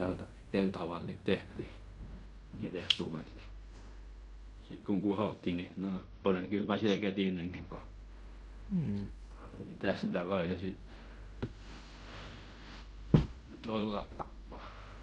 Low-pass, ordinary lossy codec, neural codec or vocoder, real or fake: 10.8 kHz; MP3, 96 kbps; codec, 16 kHz in and 24 kHz out, 0.9 kbps, LongCat-Audio-Codec, fine tuned four codebook decoder; fake